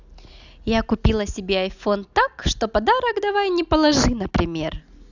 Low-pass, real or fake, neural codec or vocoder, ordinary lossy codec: 7.2 kHz; real; none; none